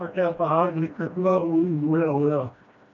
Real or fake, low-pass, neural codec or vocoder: fake; 7.2 kHz; codec, 16 kHz, 1 kbps, FreqCodec, smaller model